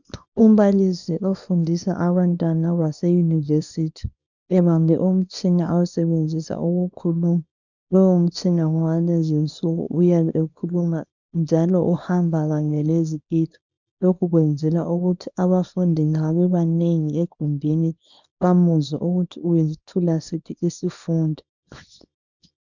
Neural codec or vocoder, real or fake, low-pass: codec, 24 kHz, 0.9 kbps, WavTokenizer, small release; fake; 7.2 kHz